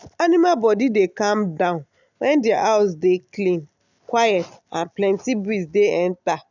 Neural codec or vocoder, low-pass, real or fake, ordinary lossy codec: none; 7.2 kHz; real; none